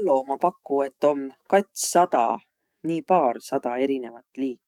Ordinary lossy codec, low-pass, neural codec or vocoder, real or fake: none; 19.8 kHz; codec, 44.1 kHz, 7.8 kbps, DAC; fake